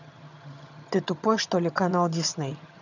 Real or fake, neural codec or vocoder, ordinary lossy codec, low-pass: fake; vocoder, 22.05 kHz, 80 mel bands, HiFi-GAN; none; 7.2 kHz